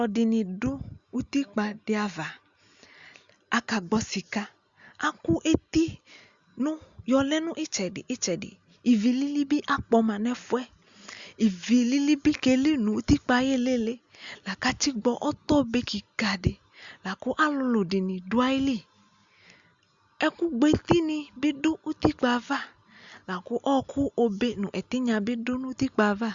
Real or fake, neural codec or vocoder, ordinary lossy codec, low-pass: real; none; Opus, 64 kbps; 7.2 kHz